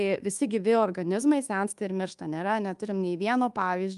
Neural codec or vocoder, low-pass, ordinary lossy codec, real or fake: codec, 24 kHz, 1.2 kbps, DualCodec; 10.8 kHz; Opus, 32 kbps; fake